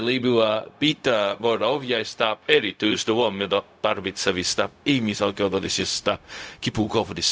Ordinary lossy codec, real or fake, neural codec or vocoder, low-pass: none; fake; codec, 16 kHz, 0.4 kbps, LongCat-Audio-Codec; none